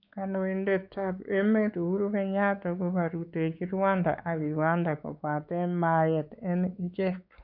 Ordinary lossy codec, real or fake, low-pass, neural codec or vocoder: MP3, 48 kbps; fake; 5.4 kHz; codec, 16 kHz, 4 kbps, X-Codec, WavLM features, trained on Multilingual LibriSpeech